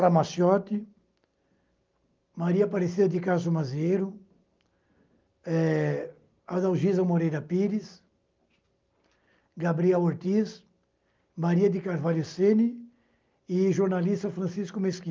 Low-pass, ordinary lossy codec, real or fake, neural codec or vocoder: 7.2 kHz; Opus, 32 kbps; real; none